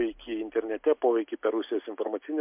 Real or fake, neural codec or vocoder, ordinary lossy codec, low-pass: real; none; MP3, 32 kbps; 3.6 kHz